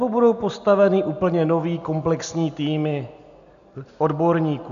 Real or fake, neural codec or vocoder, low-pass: real; none; 7.2 kHz